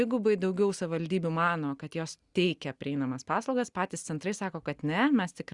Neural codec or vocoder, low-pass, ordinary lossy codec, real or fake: none; 10.8 kHz; Opus, 64 kbps; real